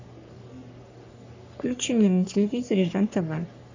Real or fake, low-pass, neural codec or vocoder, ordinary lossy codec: fake; 7.2 kHz; codec, 44.1 kHz, 3.4 kbps, Pupu-Codec; AAC, 48 kbps